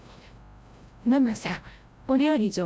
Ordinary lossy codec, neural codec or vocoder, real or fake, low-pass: none; codec, 16 kHz, 0.5 kbps, FreqCodec, larger model; fake; none